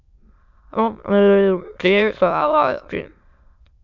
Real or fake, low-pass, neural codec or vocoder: fake; 7.2 kHz; autoencoder, 22.05 kHz, a latent of 192 numbers a frame, VITS, trained on many speakers